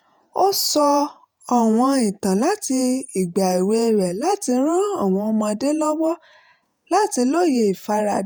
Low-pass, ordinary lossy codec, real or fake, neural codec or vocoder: none; none; fake; vocoder, 48 kHz, 128 mel bands, Vocos